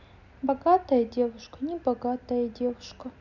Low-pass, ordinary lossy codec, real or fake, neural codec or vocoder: 7.2 kHz; none; real; none